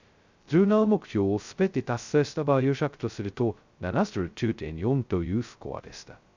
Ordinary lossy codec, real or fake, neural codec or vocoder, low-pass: Opus, 64 kbps; fake; codec, 16 kHz, 0.2 kbps, FocalCodec; 7.2 kHz